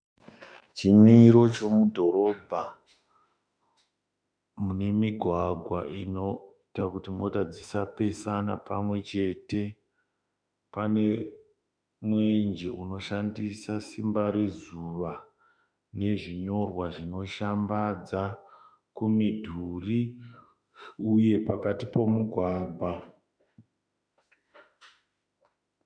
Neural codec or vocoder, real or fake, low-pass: autoencoder, 48 kHz, 32 numbers a frame, DAC-VAE, trained on Japanese speech; fake; 9.9 kHz